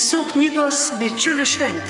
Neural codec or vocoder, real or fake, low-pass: codec, 32 kHz, 1.9 kbps, SNAC; fake; 10.8 kHz